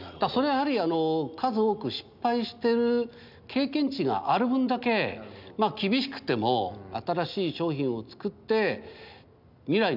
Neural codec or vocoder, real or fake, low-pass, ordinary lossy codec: none; real; 5.4 kHz; none